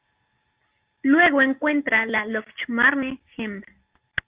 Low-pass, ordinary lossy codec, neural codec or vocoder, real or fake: 3.6 kHz; Opus, 24 kbps; none; real